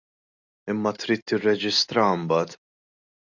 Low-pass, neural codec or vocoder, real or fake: 7.2 kHz; none; real